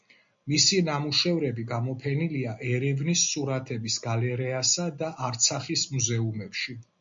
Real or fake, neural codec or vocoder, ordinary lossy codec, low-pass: real; none; MP3, 48 kbps; 7.2 kHz